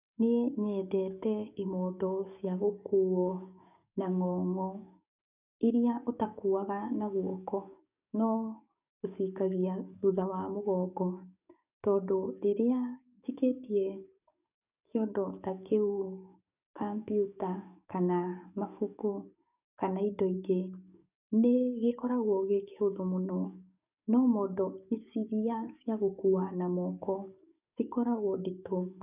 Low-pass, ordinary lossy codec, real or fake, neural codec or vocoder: 3.6 kHz; none; fake; codec, 44.1 kHz, 7.8 kbps, DAC